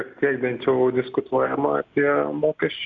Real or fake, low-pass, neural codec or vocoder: real; 7.2 kHz; none